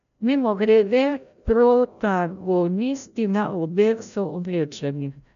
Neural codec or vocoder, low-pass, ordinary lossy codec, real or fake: codec, 16 kHz, 0.5 kbps, FreqCodec, larger model; 7.2 kHz; none; fake